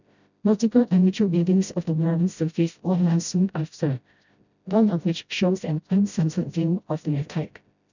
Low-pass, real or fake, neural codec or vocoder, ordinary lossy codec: 7.2 kHz; fake; codec, 16 kHz, 0.5 kbps, FreqCodec, smaller model; none